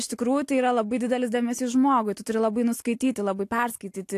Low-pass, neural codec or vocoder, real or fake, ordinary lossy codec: 14.4 kHz; none; real; AAC, 64 kbps